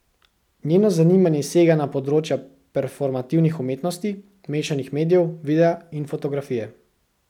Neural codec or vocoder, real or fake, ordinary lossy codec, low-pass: none; real; none; 19.8 kHz